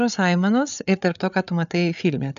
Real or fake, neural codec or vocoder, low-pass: fake; codec, 16 kHz, 8 kbps, FreqCodec, larger model; 7.2 kHz